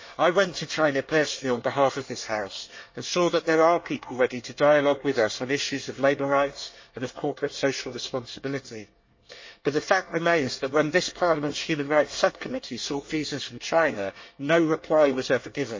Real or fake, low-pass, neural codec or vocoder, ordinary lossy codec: fake; 7.2 kHz; codec, 24 kHz, 1 kbps, SNAC; MP3, 32 kbps